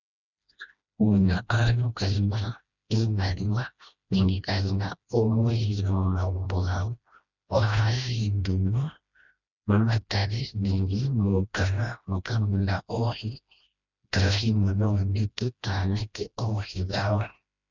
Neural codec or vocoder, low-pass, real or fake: codec, 16 kHz, 1 kbps, FreqCodec, smaller model; 7.2 kHz; fake